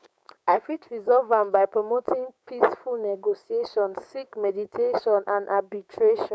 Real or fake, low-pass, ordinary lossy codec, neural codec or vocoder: fake; none; none; codec, 16 kHz, 6 kbps, DAC